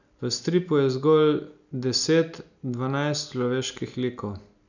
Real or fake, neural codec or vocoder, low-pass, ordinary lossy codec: real; none; 7.2 kHz; none